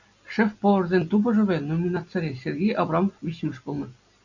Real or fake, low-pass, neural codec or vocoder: real; 7.2 kHz; none